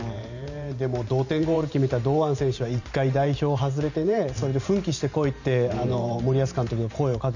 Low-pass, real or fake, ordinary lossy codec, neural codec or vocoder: 7.2 kHz; fake; none; vocoder, 44.1 kHz, 128 mel bands every 512 samples, BigVGAN v2